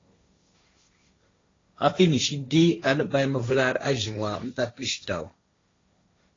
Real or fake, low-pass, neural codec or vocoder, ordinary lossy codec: fake; 7.2 kHz; codec, 16 kHz, 1.1 kbps, Voila-Tokenizer; AAC, 32 kbps